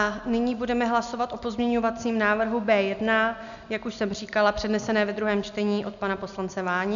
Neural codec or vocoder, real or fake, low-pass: none; real; 7.2 kHz